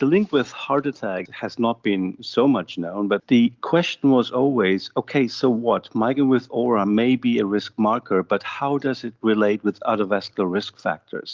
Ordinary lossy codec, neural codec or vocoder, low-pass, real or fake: Opus, 32 kbps; none; 7.2 kHz; real